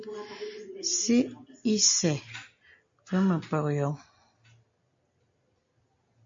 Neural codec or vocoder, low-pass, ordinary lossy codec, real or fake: none; 7.2 kHz; MP3, 48 kbps; real